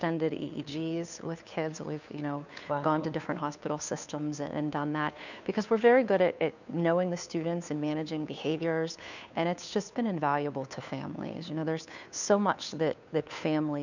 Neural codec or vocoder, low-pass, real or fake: codec, 16 kHz, 2 kbps, FunCodec, trained on Chinese and English, 25 frames a second; 7.2 kHz; fake